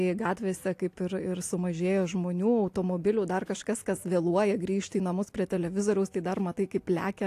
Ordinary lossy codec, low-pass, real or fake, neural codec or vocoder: AAC, 64 kbps; 14.4 kHz; real; none